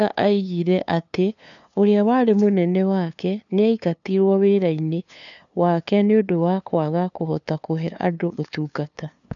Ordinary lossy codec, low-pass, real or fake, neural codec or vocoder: none; 7.2 kHz; fake; codec, 16 kHz, 2 kbps, FunCodec, trained on LibriTTS, 25 frames a second